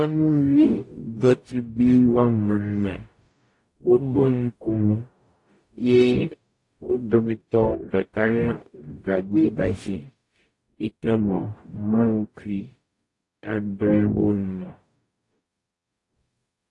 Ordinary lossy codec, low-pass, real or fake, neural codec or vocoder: AAC, 48 kbps; 10.8 kHz; fake; codec, 44.1 kHz, 0.9 kbps, DAC